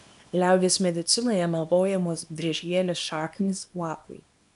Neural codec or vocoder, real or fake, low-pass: codec, 24 kHz, 0.9 kbps, WavTokenizer, small release; fake; 10.8 kHz